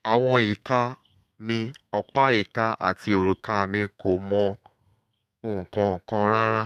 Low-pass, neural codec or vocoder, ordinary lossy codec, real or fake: 14.4 kHz; codec, 32 kHz, 1.9 kbps, SNAC; none; fake